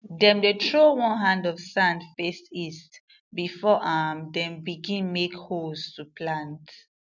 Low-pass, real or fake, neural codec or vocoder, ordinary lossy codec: 7.2 kHz; real; none; none